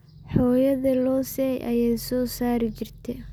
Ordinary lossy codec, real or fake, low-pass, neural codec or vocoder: none; real; none; none